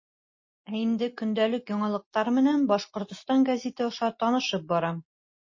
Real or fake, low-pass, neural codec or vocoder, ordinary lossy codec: real; 7.2 kHz; none; MP3, 32 kbps